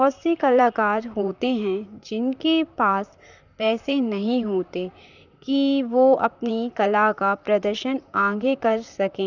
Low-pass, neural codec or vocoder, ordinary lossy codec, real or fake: 7.2 kHz; vocoder, 44.1 kHz, 128 mel bands, Pupu-Vocoder; none; fake